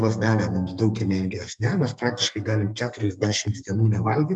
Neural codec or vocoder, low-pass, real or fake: codec, 32 kHz, 1.9 kbps, SNAC; 10.8 kHz; fake